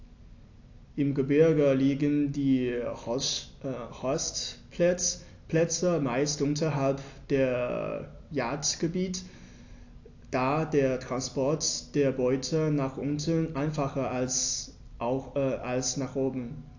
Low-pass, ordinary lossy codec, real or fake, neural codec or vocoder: 7.2 kHz; AAC, 48 kbps; real; none